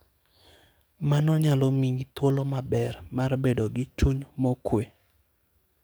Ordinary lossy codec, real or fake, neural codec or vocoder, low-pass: none; fake; codec, 44.1 kHz, 7.8 kbps, DAC; none